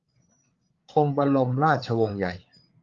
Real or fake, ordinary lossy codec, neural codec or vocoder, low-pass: fake; Opus, 32 kbps; codec, 16 kHz, 8 kbps, FreqCodec, larger model; 7.2 kHz